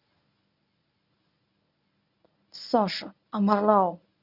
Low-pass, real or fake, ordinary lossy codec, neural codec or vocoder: 5.4 kHz; fake; none; codec, 24 kHz, 0.9 kbps, WavTokenizer, medium speech release version 1